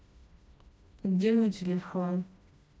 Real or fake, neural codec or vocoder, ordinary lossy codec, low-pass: fake; codec, 16 kHz, 1 kbps, FreqCodec, smaller model; none; none